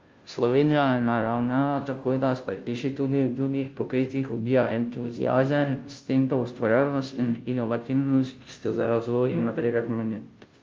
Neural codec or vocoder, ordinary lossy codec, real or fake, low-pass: codec, 16 kHz, 0.5 kbps, FunCodec, trained on Chinese and English, 25 frames a second; Opus, 32 kbps; fake; 7.2 kHz